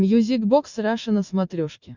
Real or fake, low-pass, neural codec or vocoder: real; 7.2 kHz; none